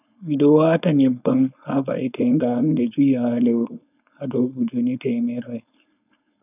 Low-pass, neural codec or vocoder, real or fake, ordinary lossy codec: 3.6 kHz; codec, 16 kHz, 4.8 kbps, FACodec; fake; none